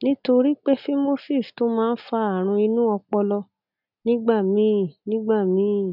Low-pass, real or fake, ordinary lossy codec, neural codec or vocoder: 5.4 kHz; real; none; none